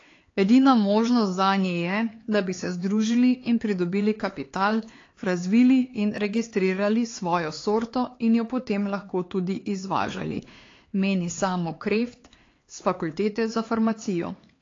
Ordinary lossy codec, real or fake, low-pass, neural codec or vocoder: AAC, 32 kbps; fake; 7.2 kHz; codec, 16 kHz, 4 kbps, X-Codec, HuBERT features, trained on LibriSpeech